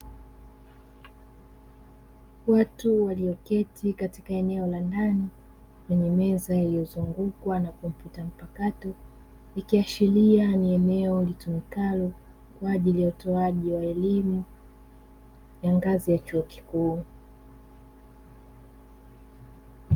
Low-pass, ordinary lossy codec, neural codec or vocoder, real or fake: 19.8 kHz; Opus, 32 kbps; none; real